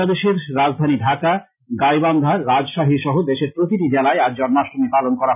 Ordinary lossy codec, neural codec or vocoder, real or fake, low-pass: none; none; real; 3.6 kHz